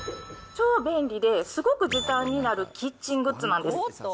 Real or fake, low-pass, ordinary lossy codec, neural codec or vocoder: real; none; none; none